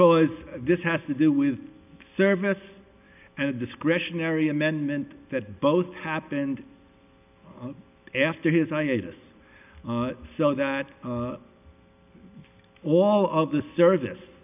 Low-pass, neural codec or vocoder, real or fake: 3.6 kHz; none; real